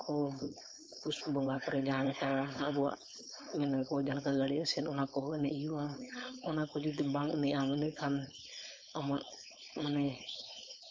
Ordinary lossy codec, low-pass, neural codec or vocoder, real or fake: none; none; codec, 16 kHz, 4.8 kbps, FACodec; fake